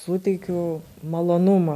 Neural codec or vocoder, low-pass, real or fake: none; 14.4 kHz; real